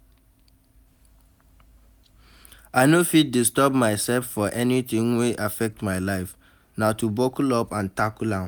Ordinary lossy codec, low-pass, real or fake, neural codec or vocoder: none; none; real; none